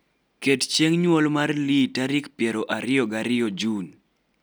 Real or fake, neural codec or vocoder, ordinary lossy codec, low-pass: real; none; none; none